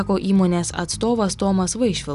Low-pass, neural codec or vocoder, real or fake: 10.8 kHz; none; real